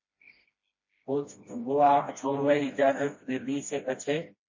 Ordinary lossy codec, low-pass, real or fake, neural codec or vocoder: MP3, 32 kbps; 7.2 kHz; fake; codec, 16 kHz, 1 kbps, FreqCodec, smaller model